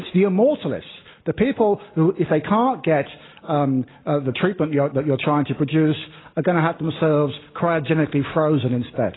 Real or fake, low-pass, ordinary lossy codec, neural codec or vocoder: real; 7.2 kHz; AAC, 16 kbps; none